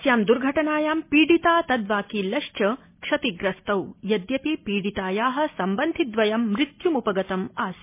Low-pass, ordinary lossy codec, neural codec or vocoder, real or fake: 3.6 kHz; MP3, 24 kbps; none; real